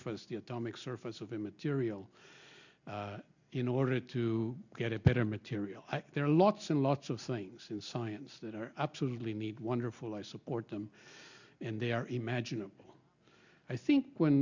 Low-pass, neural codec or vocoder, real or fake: 7.2 kHz; none; real